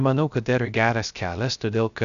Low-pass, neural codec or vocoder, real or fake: 7.2 kHz; codec, 16 kHz, 0.3 kbps, FocalCodec; fake